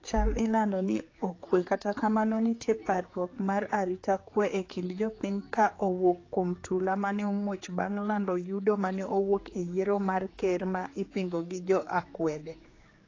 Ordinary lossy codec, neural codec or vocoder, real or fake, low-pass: AAC, 32 kbps; codec, 16 kHz, 4 kbps, X-Codec, HuBERT features, trained on general audio; fake; 7.2 kHz